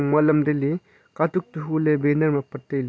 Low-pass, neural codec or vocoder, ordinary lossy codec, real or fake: none; none; none; real